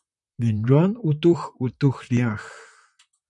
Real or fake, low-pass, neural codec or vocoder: fake; 10.8 kHz; vocoder, 44.1 kHz, 128 mel bands, Pupu-Vocoder